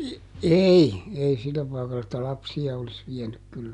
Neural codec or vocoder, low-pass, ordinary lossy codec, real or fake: none; 10.8 kHz; none; real